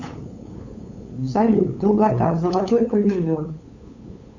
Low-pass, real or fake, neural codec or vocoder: 7.2 kHz; fake; codec, 16 kHz, 8 kbps, FunCodec, trained on LibriTTS, 25 frames a second